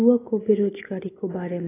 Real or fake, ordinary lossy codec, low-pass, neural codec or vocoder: real; AAC, 16 kbps; 3.6 kHz; none